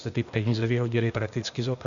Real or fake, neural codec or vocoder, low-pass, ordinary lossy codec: fake; codec, 16 kHz, 0.8 kbps, ZipCodec; 7.2 kHz; Opus, 64 kbps